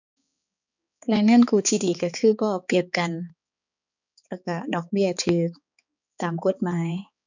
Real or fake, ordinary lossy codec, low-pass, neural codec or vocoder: fake; none; 7.2 kHz; codec, 16 kHz, 4 kbps, X-Codec, HuBERT features, trained on balanced general audio